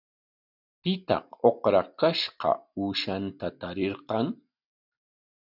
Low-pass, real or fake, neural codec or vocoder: 5.4 kHz; real; none